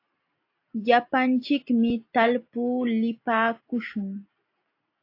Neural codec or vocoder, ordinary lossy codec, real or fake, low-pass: none; AAC, 32 kbps; real; 5.4 kHz